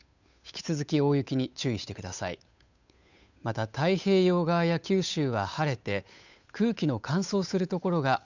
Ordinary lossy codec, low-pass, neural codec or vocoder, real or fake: none; 7.2 kHz; codec, 16 kHz, 8 kbps, FunCodec, trained on Chinese and English, 25 frames a second; fake